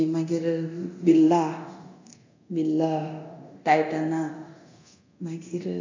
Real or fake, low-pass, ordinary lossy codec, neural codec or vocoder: fake; 7.2 kHz; AAC, 48 kbps; codec, 24 kHz, 0.9 kbps, DualCodec